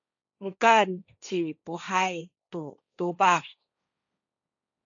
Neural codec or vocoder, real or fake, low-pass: codec, 16 kHz, 1.1 kbps, Voila-Tokenizer; fake; 7.2 kHz